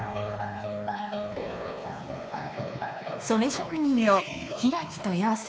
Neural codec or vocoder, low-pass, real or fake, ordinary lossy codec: codec, 16 kHz, 2 kbps, X-Codec, WavLM features, trained on Multilingual LibriSpeech; none; fake; none